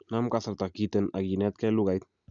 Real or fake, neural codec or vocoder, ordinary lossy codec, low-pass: real; none; none; 7.2 kHz